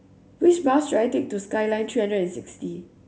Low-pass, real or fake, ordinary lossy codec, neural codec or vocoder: none; real; none; none